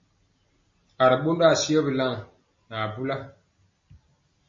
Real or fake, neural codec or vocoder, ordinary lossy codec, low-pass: real; none; MP3, 32 kbps; 7.2 kHz